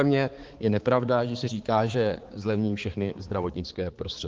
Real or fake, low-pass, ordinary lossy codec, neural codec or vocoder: fake; 7.2 kHz; Opus, 16 kbps; codec, 16 kHz, 4 kbps, X-Codec, HuBERT features, trained on balanced general audio